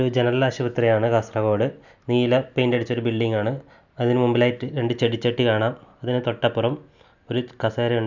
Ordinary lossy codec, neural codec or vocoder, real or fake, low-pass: none; none; real; 7.2 kHz